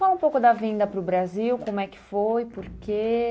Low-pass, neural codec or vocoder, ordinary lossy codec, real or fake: none; none; none; real